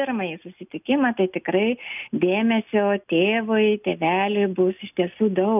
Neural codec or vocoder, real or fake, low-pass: none; real; 3.6 kHz